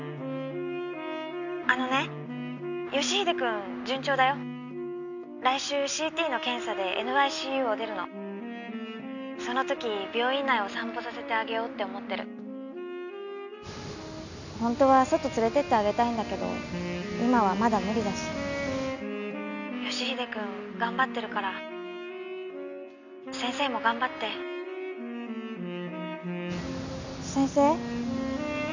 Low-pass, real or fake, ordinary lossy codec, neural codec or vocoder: 7.2 kHz; real; none; none